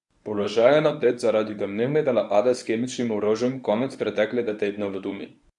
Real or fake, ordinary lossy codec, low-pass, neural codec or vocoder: fake; none; 10.8 kHz; codec, 24 kHz, 0.9 kbps, WavTokenizer, medium speech release version 1